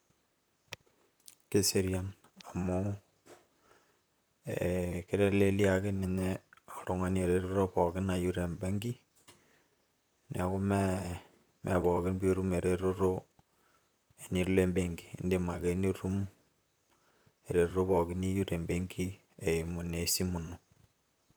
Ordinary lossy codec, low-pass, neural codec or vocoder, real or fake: none; none; vocoder, 44.1 kHz, 128 mel bands, Pupu-Vocoder; fake